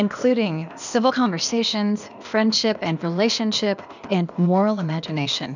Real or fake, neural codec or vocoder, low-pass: fake; codec, 16 kHz, 0.8 kbps, ZipCodec; 7.2 kHz